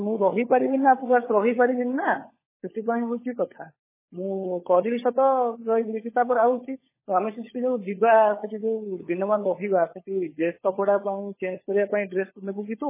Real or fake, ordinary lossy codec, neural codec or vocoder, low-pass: fake; MP3, 16 kbps; codec, 16 kHz, 16 kbps, FunCodec, trained on LibriTTS, 50 frames a second; 3.6 kHz